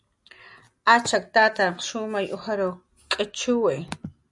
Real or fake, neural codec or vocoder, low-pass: real; none; 10.8 kHz